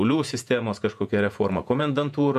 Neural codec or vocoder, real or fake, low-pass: vocoder, 48 kHz, 128 mel bands, Vocos; fake; 14.4 kHz